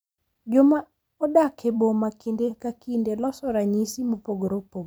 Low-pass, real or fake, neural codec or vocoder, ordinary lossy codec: none; fake; vocoder, 44.1 kHz, 128 mel bands every 256 samples, BigVGAN v2; none